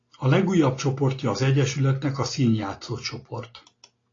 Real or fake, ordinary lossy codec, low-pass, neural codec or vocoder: real; AAC, 32 kbps; 7.2 kHz; none